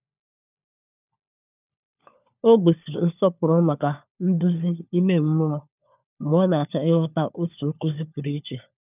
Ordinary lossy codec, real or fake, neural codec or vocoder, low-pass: none; fake; codec, 16 kHz, 4 kbps, FunCodec, trained on LibriTTS, 50 frames a second; 3.6 kHz